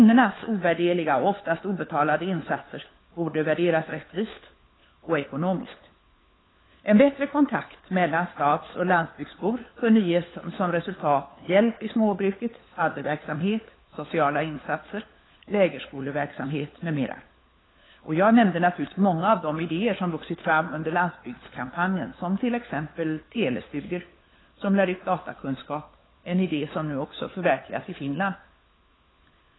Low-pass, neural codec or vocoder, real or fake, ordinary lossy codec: 7.2 kHz; codec, 16 kHz, 4 kbps, FunCodec, trained on LibriTTS, 50 frames a second; fake; AAC, 16 kbps